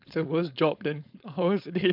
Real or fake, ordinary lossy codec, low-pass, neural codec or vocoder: fake; none; 5.4 kHz; codec, 16 kHz, 4.8 kbps, FACodec